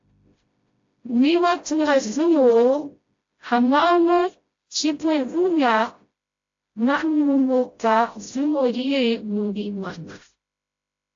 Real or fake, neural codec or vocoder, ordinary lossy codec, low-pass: fake; codec, 16 kHz, 0.5 kbps, FreqCodec, smaller model; AAC, 48 kbps; 7.2 kHz